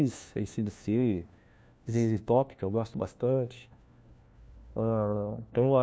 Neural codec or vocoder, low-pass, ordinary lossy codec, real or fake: codec, 16 kHz, 1 kbps, FunCodec, trained on LibriTTS, 50 frames a second; none; none; fake